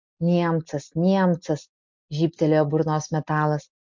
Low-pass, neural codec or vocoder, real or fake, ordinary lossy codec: 7.2 kHz; none; real; MP3, 64 kbps